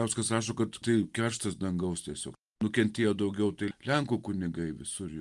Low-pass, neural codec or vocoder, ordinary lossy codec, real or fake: 10.8 kHz; none; Opus, 32 kbps; real